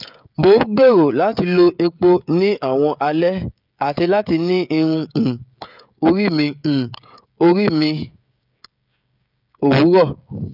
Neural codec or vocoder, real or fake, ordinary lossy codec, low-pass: codec, 16 kHz, 16 kbps, FreqCodec, smaller model; fake; none; 5.4 kHz